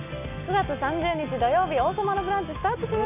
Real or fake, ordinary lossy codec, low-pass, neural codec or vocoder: real; none; 3.6 kHz; none